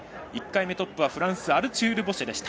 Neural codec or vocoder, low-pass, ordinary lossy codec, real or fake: none; none; none; real